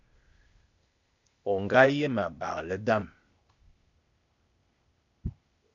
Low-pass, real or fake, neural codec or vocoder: 7.2 kHz; fake; codec, 16 kHz, 0.8 kbps, ZipCodec